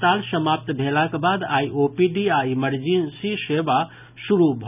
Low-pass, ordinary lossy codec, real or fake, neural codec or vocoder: 3.6 kHz; none; real; none